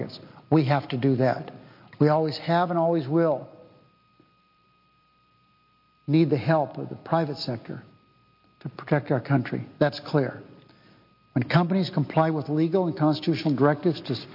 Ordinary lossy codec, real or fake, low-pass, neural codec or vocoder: MP3, 32 kbps; real; 5.4 kHz; none